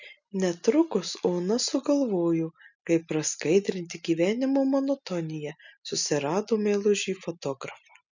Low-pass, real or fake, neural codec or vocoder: 7.2 kHz; real; none